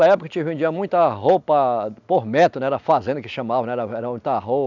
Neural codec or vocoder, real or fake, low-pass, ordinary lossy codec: none; real; 7.2 kHz; none